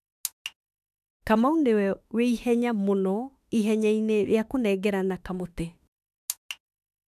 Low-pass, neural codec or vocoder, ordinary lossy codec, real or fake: 14.4 kHz; autoencoder, 48 kHz, 32 numbers a frame, DAC-VAE, trained on Japanese speech; none; fake